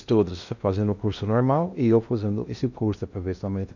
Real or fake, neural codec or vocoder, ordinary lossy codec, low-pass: fake; codec, 16 kHz in and 24 kHz out, 0.6 kbps, FocalCodec, streaming, 2048 codes; none; 7.2 kHz